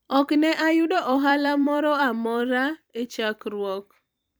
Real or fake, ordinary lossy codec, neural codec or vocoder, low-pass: fake; none; vocoder, 44.1 kHz, 128 mel bands every 256 samples, BigVGAN v2; none